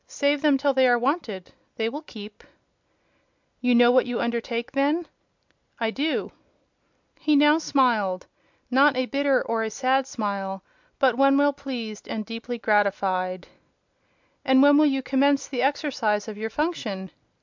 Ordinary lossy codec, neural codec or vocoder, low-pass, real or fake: MP3, 64 kbps; none; 7.2 kHz; real